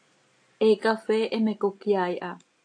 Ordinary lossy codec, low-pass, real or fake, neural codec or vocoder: AAC, 48 kbps; 9.9 kHz; real; none